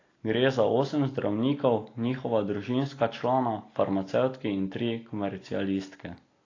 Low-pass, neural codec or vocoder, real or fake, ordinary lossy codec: 7.2 kHz; none; real; AAC, 32 kbps